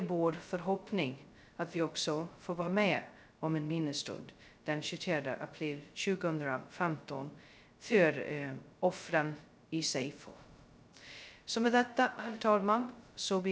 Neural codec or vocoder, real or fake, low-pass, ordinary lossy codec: codec, 16 kHz, 0.2 kbps, FocalCodec; fake; none; none